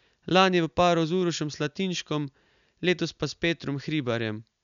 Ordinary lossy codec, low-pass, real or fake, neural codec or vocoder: MP3, 96 kbps; 7.2 kHz; real; none